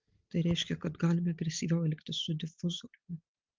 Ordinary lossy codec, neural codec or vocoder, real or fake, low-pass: Opus, 32 kbps; none; real; 7.2 kHz